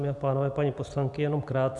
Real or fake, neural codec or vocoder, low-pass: real; none; 10.8 kHz